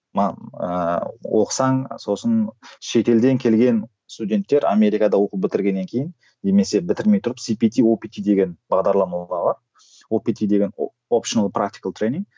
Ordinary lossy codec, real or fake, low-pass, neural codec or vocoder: none; real; none; none